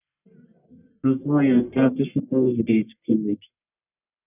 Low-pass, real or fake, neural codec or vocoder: 3.6 kHz; fake; codec, 44.1 kHz, 1.7 kbps, Pupu-Codec